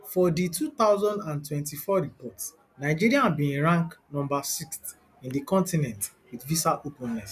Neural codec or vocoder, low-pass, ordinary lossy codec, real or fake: none; 14.4 kHz; none; real